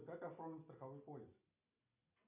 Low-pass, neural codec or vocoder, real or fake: 3.6 kHz; none; real